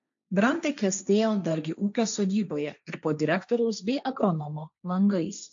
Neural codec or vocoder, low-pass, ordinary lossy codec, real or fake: codec, 16 kHz, 1.1 kbps, Voila-Tokenizer; 7.2 kHz; AAC, 64 kbps; fake